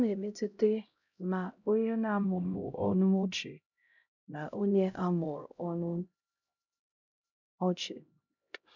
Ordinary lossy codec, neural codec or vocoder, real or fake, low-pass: none; codec, 16 kHz, 0.5 kbps, X-Codec, HuBERT features, trained on LibriSpeech; fake; 7.2 kHz